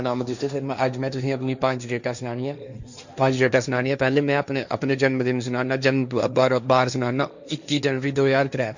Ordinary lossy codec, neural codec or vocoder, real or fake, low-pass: none; codec, 16 kHz, 1.1 kbps, Voila-Tokenizer; fake; 7.2 kHz